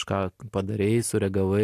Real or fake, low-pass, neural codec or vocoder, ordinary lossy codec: real; 14.4 kHz; none; AAC, 64 kbps